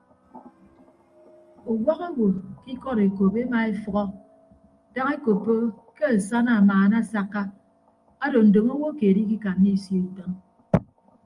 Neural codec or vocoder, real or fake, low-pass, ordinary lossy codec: none; real; 10.8 kHz; Opus, 32 kbps